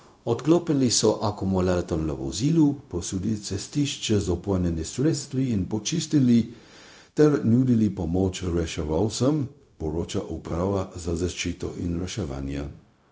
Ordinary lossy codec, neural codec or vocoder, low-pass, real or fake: none; codec, 16 kHz, 0.4 kbps, LongCat-Audio-Codec; none; fake